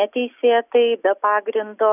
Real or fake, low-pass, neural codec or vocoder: real; 3.6 kHz; none